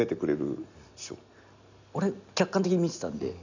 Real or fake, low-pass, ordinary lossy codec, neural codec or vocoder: real; 7.2 kHz; none; none